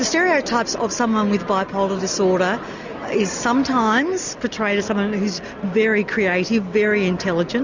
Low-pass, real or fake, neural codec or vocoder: 7.2 kHz; real; none